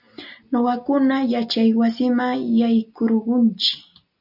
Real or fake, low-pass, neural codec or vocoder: real; 5.4 kHz; none